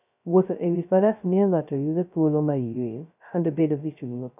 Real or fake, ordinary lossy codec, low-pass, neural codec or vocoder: fake; none; 3.6 kHz; codec, 16 kHz, 0.2 kbps, FocalCodec